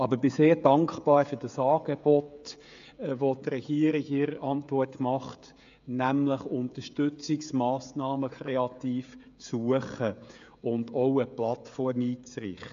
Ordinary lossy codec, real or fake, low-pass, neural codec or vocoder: AAC, 64 kbps; fake; 7.2 kHz; codec, 16 kHz, 16 kbps, FreqCodec, smaller model